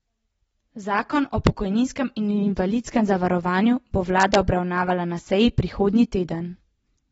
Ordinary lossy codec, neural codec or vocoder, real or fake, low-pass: AAC, 24 kbps; none; real; 14.4 kHz